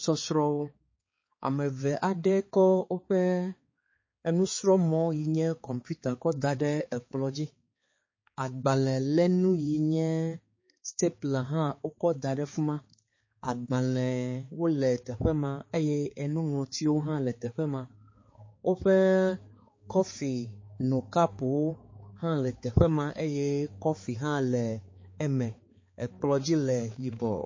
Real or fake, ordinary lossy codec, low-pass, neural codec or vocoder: fake; MP3, 32 kbps; 7.2 kHz; codec, 16 kHz, 4 kbps, X-Codec, HuBERT features, trained on balanced general audio